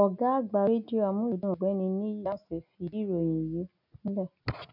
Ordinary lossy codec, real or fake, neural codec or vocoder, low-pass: none; real; none; 5.4 kHz